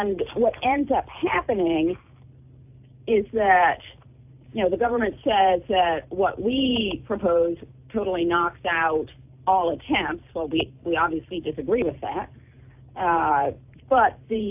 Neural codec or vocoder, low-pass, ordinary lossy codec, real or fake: none; 3.6 kHz; AAC, 32 kbps; real